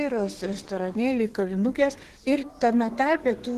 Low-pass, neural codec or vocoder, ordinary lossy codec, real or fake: 14.4 kHz; codec, 32 kHz, 1.9 kbps, SNAC; Opus, 16 kbps; fake